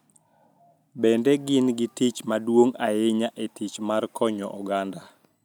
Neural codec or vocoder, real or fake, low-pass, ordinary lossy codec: none; real; none; none